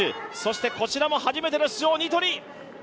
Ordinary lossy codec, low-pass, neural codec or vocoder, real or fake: none; none; none; real